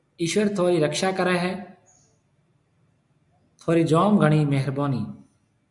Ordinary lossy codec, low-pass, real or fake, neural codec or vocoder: MP3, 96 kbps; 10.8 kHz; real; none